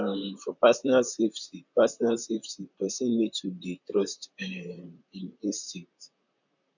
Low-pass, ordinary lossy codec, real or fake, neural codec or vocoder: 7.2 kHz; none; fake; vocoder, 44.1 kHz, 128 mel bands, Pupu-Vocoder